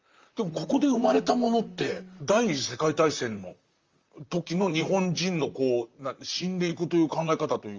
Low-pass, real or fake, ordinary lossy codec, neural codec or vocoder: 7.2 kHz; fake; Opus, 32 kbps; vocoder, 44.1 kHz, 128 mel bands, Pupu-Vocoder